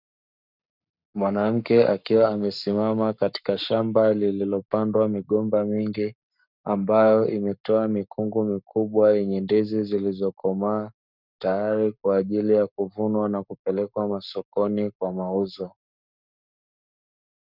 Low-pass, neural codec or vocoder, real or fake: 5.4 kHz; codec, 44.1 kHz, 7.8 kbps, Pupu-Codec; fake